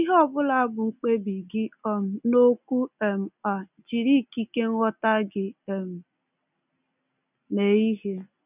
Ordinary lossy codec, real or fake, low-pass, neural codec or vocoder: none; real; 3.6 kHz; none